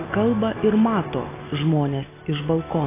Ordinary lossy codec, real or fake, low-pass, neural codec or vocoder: AAC, 16 kbps; real; 3.6 kHz; none